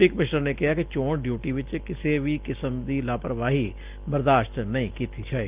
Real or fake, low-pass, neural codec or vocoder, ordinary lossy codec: real; 3.6 kHz; none; Opus, 32 kbps